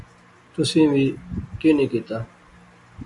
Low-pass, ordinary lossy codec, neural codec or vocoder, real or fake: 10.8 kHz; AAC, 64 kbps; none; real